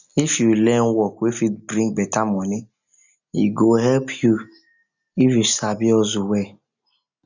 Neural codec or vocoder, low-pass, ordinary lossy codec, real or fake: none; 7.2 kHz; none; real